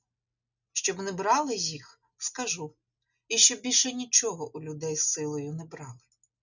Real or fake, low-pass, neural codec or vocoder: real; 7.2 kHz; none